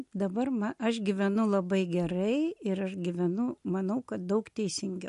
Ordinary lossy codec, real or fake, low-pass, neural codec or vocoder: MP3, 48 kbps; real; 14.4 kHz; none